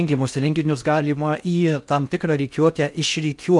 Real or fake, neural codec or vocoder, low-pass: fake; codec, 16 kHz in and 24 kHz out, 0.6 kbps, FocalCodec, streaming, 4096 codes; 10.8 kHz